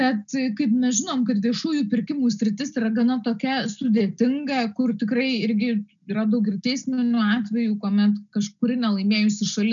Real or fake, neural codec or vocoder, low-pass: real; none; 7.2 kHz